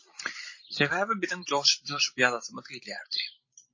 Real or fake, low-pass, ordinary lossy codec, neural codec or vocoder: fake; 7.2 kHz; MP3, 32 kbps; vocoder, 44.1 kHz, 128 mel bands every 256 samples, BigVGAN v2